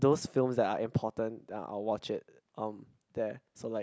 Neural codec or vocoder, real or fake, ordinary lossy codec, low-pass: none; real; none; none